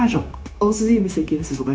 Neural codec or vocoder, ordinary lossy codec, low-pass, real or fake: codec, 16 kHz, 0.9 kbps, LongCat-Audio-Codec; none; none; fake